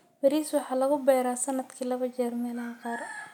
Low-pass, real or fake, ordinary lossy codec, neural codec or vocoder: 19.8 kHz; real; none; none